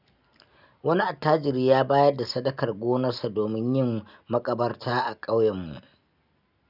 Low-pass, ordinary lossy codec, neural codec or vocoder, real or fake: 5.4 kHz; none; none; real